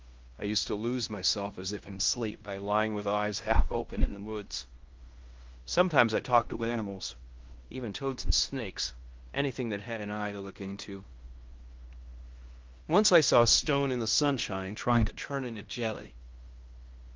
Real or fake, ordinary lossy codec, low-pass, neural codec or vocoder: fake; Opus, 32 kbps; 7.2 kHz; codec, 16 kHz in and 24 kHz out, 0.9 kbps, LongCat-Audio-Codec, fine tuned four codebook decoder